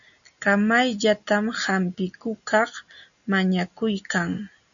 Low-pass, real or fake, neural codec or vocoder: 7.2 kHz; real; none